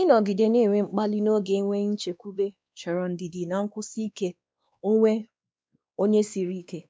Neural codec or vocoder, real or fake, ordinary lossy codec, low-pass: codec, 16 kHz, 2 kbps, X-Codec, WavLM features, trained on Multilingual LibriSpeech; fake; none; none